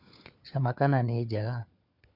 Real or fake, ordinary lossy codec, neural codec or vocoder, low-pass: fake; none; codec, 16 kHz, 4 kbps, FunCodec, trained on LibriTTS, 50 frames a second; 5.4 kHz